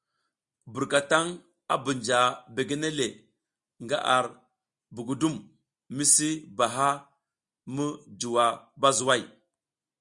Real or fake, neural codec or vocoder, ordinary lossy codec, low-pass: real; none; Opus, 64 kbps; 10.8 kHz